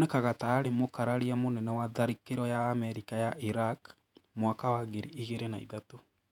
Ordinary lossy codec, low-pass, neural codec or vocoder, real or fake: none; 19.8 kHz; vocoder, 48 kHz, 128 mel bands, Vocos; fake